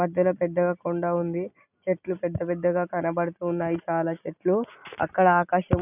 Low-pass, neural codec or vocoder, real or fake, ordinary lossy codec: 3.6 kHz; none; real; none